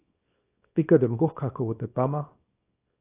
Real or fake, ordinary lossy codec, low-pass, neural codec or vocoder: fake; AAC, 16 kbps; 3.6 kHz; codec, 24 kHz, 0.9 kbps, WavTokenizer, small release